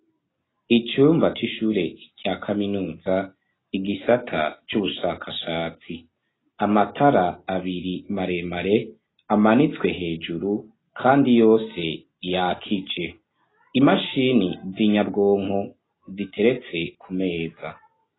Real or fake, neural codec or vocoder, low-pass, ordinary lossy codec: real; none; 7.2 kHz; AAC, 16 kbps